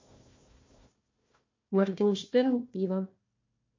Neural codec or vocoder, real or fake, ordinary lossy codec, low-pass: codec, 16 kHz, 1 kbps, FunCodec, trained on Chinese and English, 50 frames a second; fake; MP3, 48 kbps; 7.2 kHz